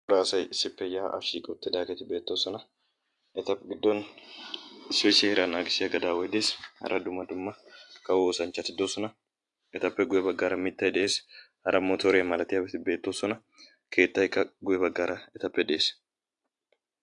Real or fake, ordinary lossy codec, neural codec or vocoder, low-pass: real; AAC, 64 kbps; none; 10.8 kHz